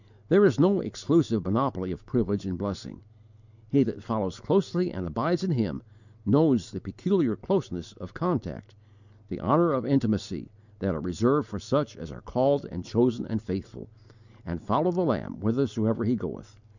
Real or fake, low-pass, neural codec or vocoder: real; 7.2 kHz; none